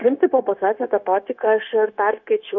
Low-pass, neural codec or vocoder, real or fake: 7.2 kHz; codec, 16 kHz, 0.9 kbps, LongCat-Audio-Codec; fake